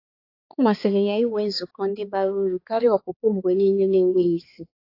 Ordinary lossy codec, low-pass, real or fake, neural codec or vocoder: AAC, 32 kbps; 5.4 kHz; fake; codec, 16 kHz, 4 kbps, X-Codec, HuBERT features, trained on balanced general audio